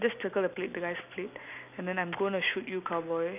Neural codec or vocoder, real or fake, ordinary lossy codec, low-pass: none; real; none; 3.6 kHz